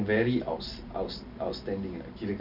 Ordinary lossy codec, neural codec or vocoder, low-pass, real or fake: none; none; 5.4 kHz; real